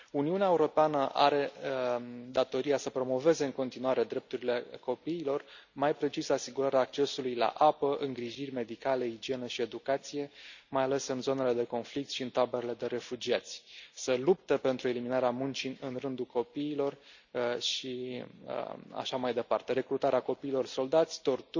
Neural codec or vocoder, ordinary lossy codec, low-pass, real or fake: none; none; 7.2 kHz; real